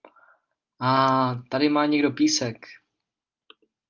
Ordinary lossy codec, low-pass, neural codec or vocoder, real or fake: Opus, 32 kbps; 7.2 kHz; none; real